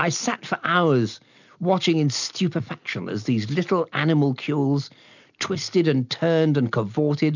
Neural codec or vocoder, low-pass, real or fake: none; 7.2 kHz; real